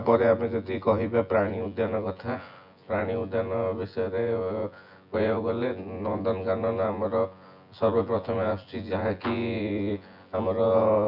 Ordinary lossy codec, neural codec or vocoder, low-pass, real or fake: none; vocoder, 24 kHz, 100 mel bands, Vocos; 5.4 kHz; fake